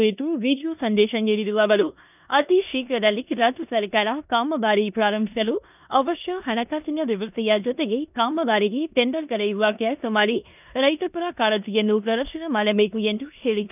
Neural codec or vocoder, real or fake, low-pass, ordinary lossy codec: codec, 16 kHz in and 24 kHz out, 0.9 kbps, LongCat-Audio-Codec, four codebook decoder; fake; 3.6 kHz; none